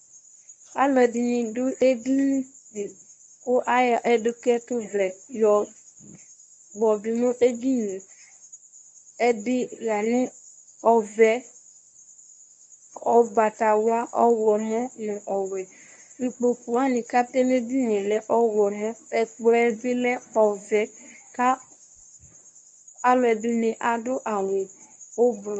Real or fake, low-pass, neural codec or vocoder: fake; 9.9 kHz; codec, 24 kHz, 0.9 kbps, WavTokenizer, medium speech release version 1